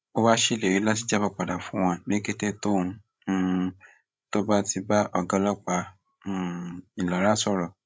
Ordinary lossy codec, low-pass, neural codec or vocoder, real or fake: none; none; codec, 16 kHz, 16 kbps, FreqCodec, larger model; fake